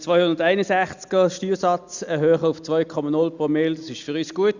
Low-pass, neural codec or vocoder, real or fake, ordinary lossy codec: 7.2 kHz; none; real; Opus, 64 kbps